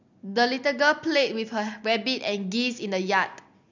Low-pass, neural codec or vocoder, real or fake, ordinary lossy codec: 7.2 kHz; none; real; none